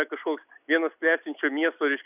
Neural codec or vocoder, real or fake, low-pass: none; real; 3.6 kHz